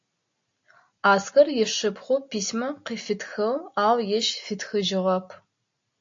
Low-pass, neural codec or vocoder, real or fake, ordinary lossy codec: 7.2 kHz; none; real; AAC, 48 kbps